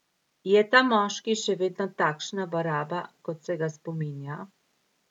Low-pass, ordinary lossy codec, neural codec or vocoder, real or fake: 19.8 kHz; none; none; real